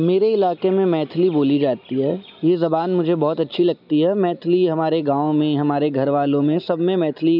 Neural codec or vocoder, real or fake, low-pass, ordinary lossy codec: none; real; 5.4 kHz; none